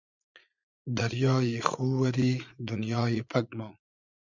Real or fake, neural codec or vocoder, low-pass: fake; vocoder, 44.1 kHz, 80 mel bands, Vocos; 7.2 kHz